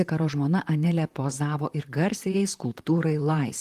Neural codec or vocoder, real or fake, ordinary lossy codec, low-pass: vocoder, 44.1 kHz, 128 mel bands, Pupu-Vocoder; fake; Opus, 24 kbps; 14.4 kHz